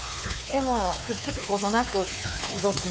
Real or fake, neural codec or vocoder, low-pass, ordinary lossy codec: fake; codec, 16 kHz, 4 kbps, X-Codec, WavLM features, trained on Multilingual LibriSpeech; none; none